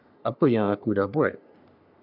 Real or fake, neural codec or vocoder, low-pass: fake; codec, 44.1 kHz, 3.4 kbps, Pupu-Codec; 5.4 kHz